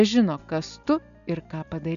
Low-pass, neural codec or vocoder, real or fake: 7.2 kHz; none; real